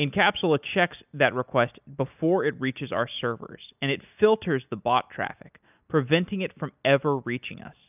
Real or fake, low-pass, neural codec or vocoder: real; 3.6 kHz; none